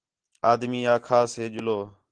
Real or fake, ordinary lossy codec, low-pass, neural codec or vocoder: real; Opus, 16 kbps; 9.9 kHz; none